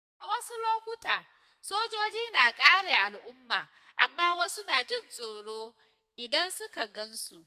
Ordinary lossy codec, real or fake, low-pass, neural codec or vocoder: AAC, 96 kbps; fake; 14.4 kHz; codec, 32 kHz, 1.9 kbps, SNAC